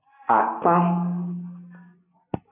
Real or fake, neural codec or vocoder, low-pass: real; none; 3.6 kHz